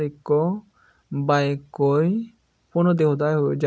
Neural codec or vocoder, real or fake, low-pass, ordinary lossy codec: none; real; none; none